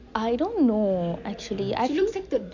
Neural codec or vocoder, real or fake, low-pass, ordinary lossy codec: none; real; 7.2 kHz; none